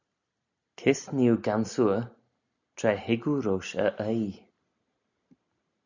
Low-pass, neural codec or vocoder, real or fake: 7.2 kHz; none; real